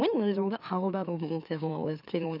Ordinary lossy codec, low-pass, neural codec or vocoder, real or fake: none; 5.4 kHz; autoencoder, 44.1 kHz, a latent of 192 numbers a frame, MeloTTS; fake